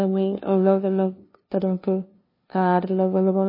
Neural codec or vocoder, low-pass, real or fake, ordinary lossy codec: codec, 16 kHz, 0.5 kbps, FunCodec, trained on LibriTTS, 25 frames a second; 5.4 kHz; fake; MP3, 24 kbps